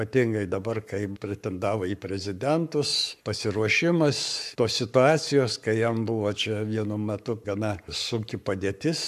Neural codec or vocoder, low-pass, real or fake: codec, 44.1 kHz, 7.8 kbps, Pupu-Codec; 14.4 kHz; fake